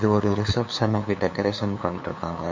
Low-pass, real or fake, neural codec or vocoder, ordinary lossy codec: 7.2 kHz; fake; codec, 16 kHz, 2 kbps, FunCodec, trained on LibriTTS, 25 frames a second; MP3, 48 kbps